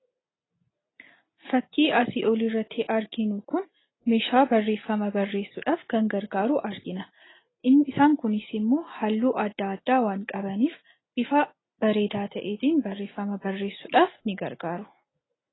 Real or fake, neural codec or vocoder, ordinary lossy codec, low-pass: real; none; AAC, 16 kbps; 7.2 kHz